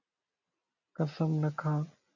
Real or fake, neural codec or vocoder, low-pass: real; none; 7.2 kHz